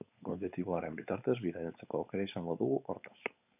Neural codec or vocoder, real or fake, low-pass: codec, 16 kHz, 4.8 kbps, FACodec; fake; 3.6 kHz